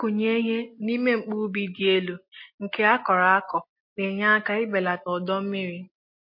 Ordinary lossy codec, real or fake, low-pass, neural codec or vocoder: MP3, 32 kbps; real; 5.4 kHz; none